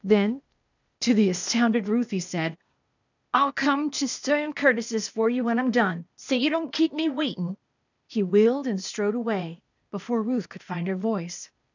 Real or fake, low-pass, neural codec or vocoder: fake; 7.2 kHz; codec, 16 kHz, 0.8 kbps, ZipCodec